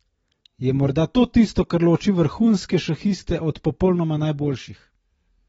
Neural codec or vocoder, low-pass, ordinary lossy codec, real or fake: none; 10.8 kHz; AAC, 24 kbps; real